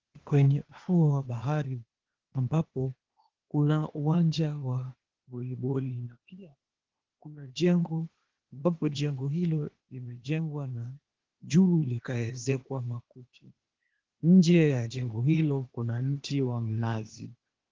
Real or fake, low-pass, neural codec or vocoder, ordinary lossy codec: fake; 7.2 kHz; codec, 16 kHz, 0.8 kbps, ZipCodec; Opus, 16 kbps